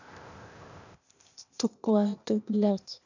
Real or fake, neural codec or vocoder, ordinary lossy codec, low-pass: fake; codec, 16 kHz, 0.8 kbps, ZipCodec; none; 7.2 kHz